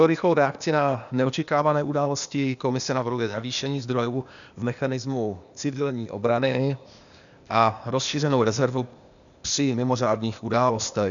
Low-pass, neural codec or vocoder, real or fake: 7.2 kHz; codec, 16 kHz, 0.8 kbps, ZipCodec; fake